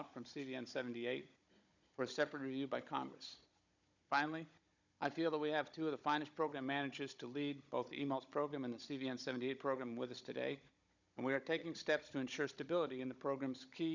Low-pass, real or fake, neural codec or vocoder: 7.2 kHz; fake; codec, 16 kHz, 16 kbps, FunCodec, trained on Chinese and English, 50 frames a second